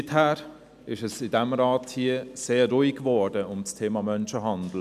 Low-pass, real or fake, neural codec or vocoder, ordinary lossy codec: 14.4 kHz; real; none; none